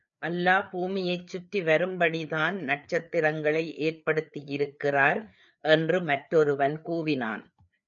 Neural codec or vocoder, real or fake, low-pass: codec, 16 kHz, 4 kbps, FreqCodec, larger model; fake; 7.2 kHz